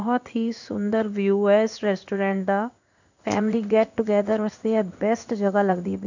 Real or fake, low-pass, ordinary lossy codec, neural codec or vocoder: fake; 7.2 kHz; none; codec, 16 kHz in and 24 kHz out, 1 kbps, XY-Tokenizer